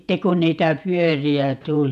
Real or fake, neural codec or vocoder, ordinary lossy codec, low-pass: fake; vocoder, 48 kHz, 128 mel bands, Vocos; none; 14.4 kHz